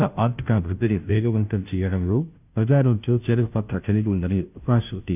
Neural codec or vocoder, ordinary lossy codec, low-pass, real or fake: codec, 16 kHz, 0.5 kbps, FunCodec, trained on Chinese and English, 25 frames a second; none; 3.6 kHz; fake